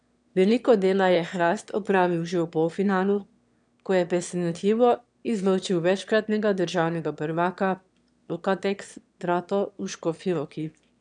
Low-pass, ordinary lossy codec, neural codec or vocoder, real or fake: 9.9 kHz; none; autoencoder, 22.05 kHz, a latent of 192 numbers a frame, VITS, trained on one speaker; fake